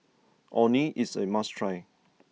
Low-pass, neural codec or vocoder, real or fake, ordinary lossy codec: none; none; real; none